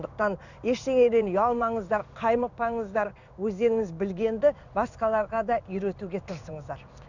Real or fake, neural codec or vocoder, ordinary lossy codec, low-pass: fake; codec, 16 kHz in and 24 kHz out, 1 kbps, XY-Tokenizer; none; 7.2 kHz